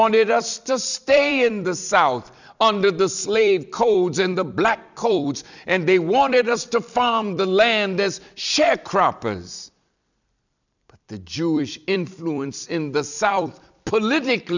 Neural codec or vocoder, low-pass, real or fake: vocoder, 44.1 kHz, 128 mel bands every 256 samples, BigVGAN v2; 7.2 kHz; fake